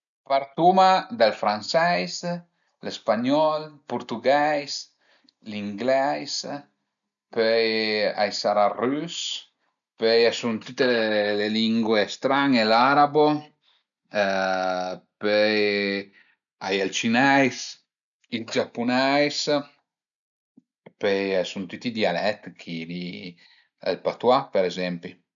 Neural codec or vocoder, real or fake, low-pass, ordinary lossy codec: none; real; 7.2 kHz; none